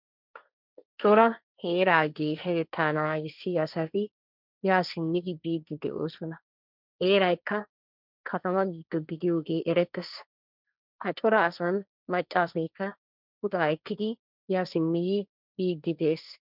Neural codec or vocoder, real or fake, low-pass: codec, 16 kHz, 1.1 kbps, Voila-Tokenizer; fake; 5.4 kHz